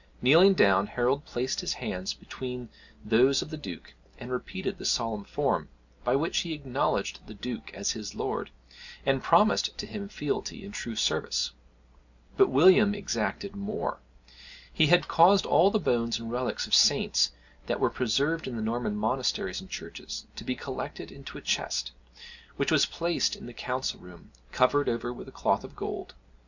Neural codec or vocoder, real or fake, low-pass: none; real; 7.2 kHz